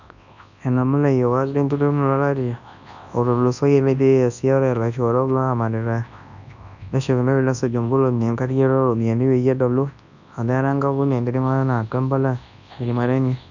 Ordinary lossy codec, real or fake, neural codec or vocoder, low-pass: none; fake; codec, 24 kHz, 0.9 kbps, WavTokenizer, large speech release; 7.2 kHz